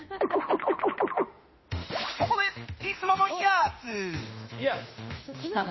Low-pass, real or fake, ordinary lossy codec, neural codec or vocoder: 7.2 kHz; fake; MP3, 24 kbps; autoencoder, 48 kHz, 32 numbers a frame, DAC-VAE, trained on Japanese speech